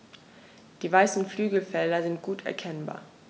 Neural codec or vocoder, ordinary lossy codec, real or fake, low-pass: none; none; real; none